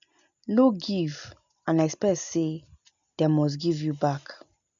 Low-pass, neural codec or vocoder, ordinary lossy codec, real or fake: 7.2 kHz; none; none; real